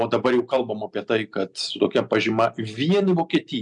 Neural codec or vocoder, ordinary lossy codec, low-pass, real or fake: none; MP3, 96 kbps; 10.8 kHz; real